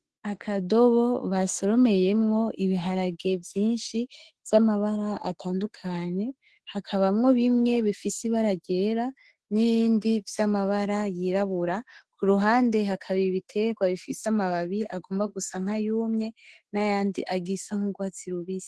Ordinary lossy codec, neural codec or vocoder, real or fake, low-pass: Opus, 16 kbps; autoencoder, 48 kHz, 32 numbers a frame, DAC-VAE, trained on Japanese speech; fake; 10.8 kHz